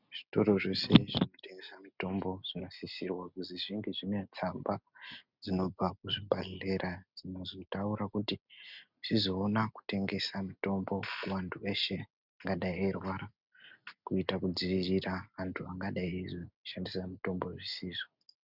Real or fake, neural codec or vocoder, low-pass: real; none; 5.4 kHz